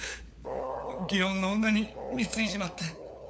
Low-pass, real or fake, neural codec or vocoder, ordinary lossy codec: none; fake; codec, 16 kHz, 4 kbps, FunCodec, trained on LibriTTS, 50 frames a second; none